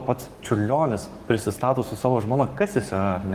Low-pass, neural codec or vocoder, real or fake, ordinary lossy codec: 14.4 kHz; codec, 44.1 kHz, 7.8 kbps, Pupu-Codec; fake; Opus, 24 kbps